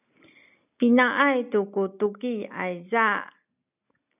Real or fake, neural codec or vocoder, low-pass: real; none; 3.6 kHz